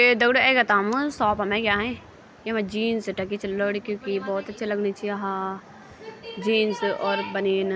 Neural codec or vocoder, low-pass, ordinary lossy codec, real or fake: none; none; none; real